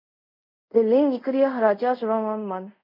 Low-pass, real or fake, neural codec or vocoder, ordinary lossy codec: 5.4 kHz; fake; codec, 24 kHz, 0.5 kbps, DualCodec; MP3, 48 kbps